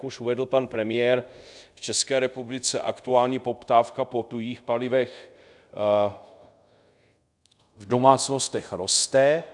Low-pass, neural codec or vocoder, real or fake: 10.8 kHz; codec, 24 kHz, 0.5 kbps, DualCodec; fake